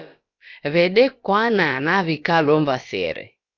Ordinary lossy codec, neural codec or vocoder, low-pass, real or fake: Opus, 64 kbps; codec, 16 kHz, about 1 kbps, DyCAST, with the encoder's durations; 7.2 kHz; fake